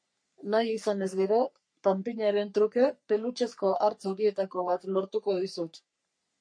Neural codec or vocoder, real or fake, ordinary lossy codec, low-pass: codec, 44.1 kHz, 3.4 kbps, Pupu-Codec; fake; MP3, 48 kbps; 9.9 kHz